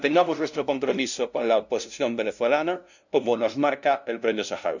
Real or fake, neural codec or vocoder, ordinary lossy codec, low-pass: fake; codec, 16 kHz, 0.5 kbps, FunCodec, trained on LibriTTS, 25 frames a second; none; 7.2 kHz